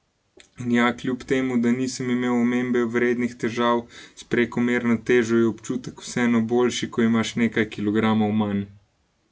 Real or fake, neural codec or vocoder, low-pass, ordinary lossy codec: real; none; none; none